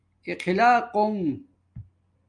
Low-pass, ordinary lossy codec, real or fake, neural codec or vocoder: 9.9 kHz; Opus, 32 kbps; real; none